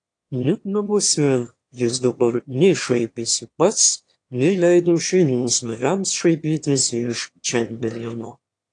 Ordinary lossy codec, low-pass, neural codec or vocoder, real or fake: AAC, 48 kbps; 9.9 kHz; autoencoder, 22.05 kHz, a latent of 192 numbers a frame, VITS, trained on one speaker; fake